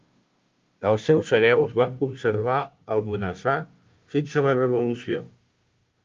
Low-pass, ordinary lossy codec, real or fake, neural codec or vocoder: 7.2 kHz; Opus, 32 kbps; fake; codec, 16 kHz, 0.5 kbps, FunCodec, trained on Chinese and English, 25 frames a second